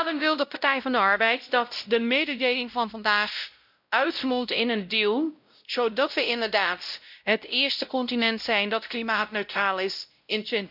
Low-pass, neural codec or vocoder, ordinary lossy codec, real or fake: 5.4 kHz; codec, 16 kHz, 0.5 kbps, X-Codec, WavLM features, trained on Multilingual LibriSpeech; none; fake